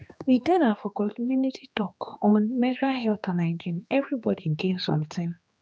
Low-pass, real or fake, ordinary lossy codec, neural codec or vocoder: none; fake; none; codec, 16 kHz, 2 kbps, X-Codec, HuBERT features, trained on general audio